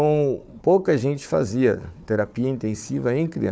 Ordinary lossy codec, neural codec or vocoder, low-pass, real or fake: none; codec, 16 kHz, 4 kbps, FunCodec, trained on Chinese and English, 50 frames a second; none; fake